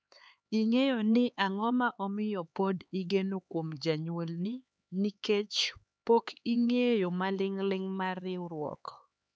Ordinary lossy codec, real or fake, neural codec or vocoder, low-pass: none; fake; codec, 16 kHz, 4 kbps, X-Codec, HuBERT features, trained on LibriSpeech; none